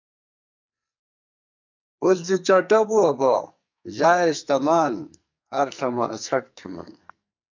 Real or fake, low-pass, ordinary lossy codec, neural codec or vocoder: fake; 7.2 kHz; MP3, 64 kbps; codec, 44.1 kHz, 2.6 kbps, SNAC